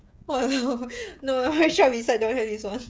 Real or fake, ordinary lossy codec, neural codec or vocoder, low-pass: fake; none; codec, 16 kHz, 16 kbps, FreqCodec, smaller model; none